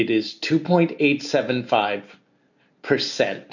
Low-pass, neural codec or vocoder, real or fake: 7.2 kHz; none; real